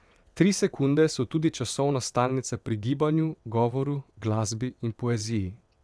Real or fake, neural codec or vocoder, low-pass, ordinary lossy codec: fake; vocoder, 22.05 kHz, 80 mel bands, WaveNeXt; none; none